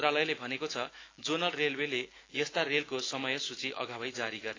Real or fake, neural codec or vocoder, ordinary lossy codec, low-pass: fake; autoencoder, 48 kHz, 128 numbers a frame, DAC-VAE, trained on Japanese speech; AAC, 32 kbps; 7.2 kHz